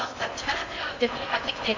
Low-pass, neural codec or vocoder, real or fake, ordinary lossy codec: 7.2 kHz; codec, 16 kHz in and 24 kHz out, 0.8 kbps, FocalCodec, streaming, 65536 codes; fake; MP3, 48 kbps